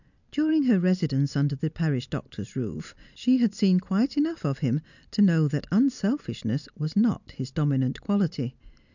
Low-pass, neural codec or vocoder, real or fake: 7.2 kHz; none; real